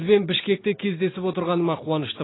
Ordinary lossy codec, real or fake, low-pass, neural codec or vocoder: AAC, 16 kbps; real; 7.2 kHz; none